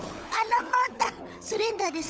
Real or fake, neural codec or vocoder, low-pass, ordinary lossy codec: fake; codec, 16 kHz, 16 kbps, FunCodec, trained on LibriTTS, 50 frames a second; none; none